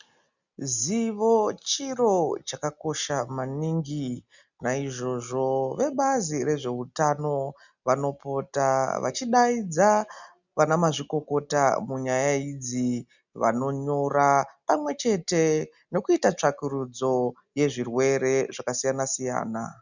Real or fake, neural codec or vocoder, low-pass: real; none; 7.2 kHz